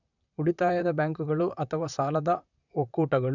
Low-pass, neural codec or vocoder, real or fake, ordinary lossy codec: 7.2 kHz; vocoder, 44.1 kHz, 128 mel bands, Pupu-Vocoder; fake; none